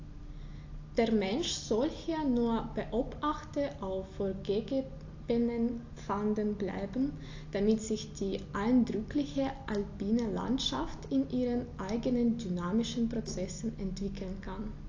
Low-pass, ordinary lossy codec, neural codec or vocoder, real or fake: 7.2 kHz; AAC, 48 kbps; none; real